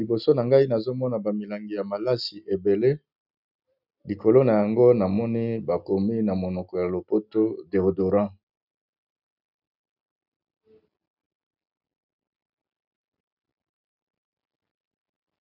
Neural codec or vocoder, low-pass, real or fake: none; 5.4 kHz; real